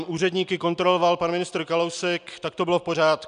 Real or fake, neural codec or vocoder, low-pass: real; none; 9.9 kHz